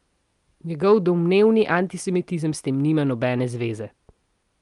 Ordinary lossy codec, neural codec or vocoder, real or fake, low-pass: Opus, 32 kbps; none; real; 10.8 kHz